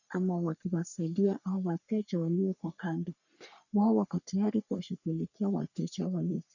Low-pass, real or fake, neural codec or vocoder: 7.2 kHz; fake; codec, 44.1 kHz, 3.4 kbps, Pupu-Codec